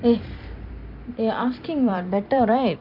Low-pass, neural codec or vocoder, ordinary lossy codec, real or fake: 5.4 kHz; vocoder, 44.1 kHz, 128 mel bands every 256 samples, BigVGAN v2; MP3, 48 kbps; fake